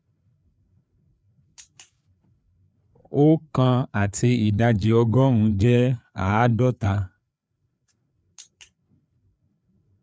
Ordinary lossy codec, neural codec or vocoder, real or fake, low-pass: none; codec, 16 kHz, 4 kbps, FreqCodec, larger model; fake; none